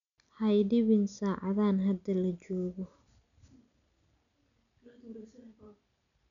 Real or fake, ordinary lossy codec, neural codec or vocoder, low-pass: real; none; none; 7.2 kHz